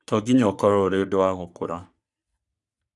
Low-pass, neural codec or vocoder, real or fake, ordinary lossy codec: 10.8 kHz; codec, 44.1 kHz, 3.4 kbps, Pupu-Codec; fake; none